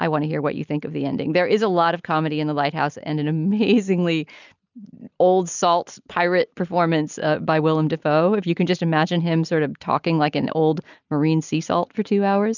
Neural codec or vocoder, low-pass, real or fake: none; 7.2 kHz; real